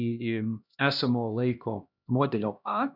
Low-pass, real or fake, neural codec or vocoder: 5.4 kHz; fake; codec, 16 kHz, 1 kbps, X-Codec, HuBERT features, trained on LibriSpeech